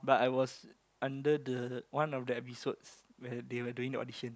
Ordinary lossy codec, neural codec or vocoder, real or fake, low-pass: none; none; real; none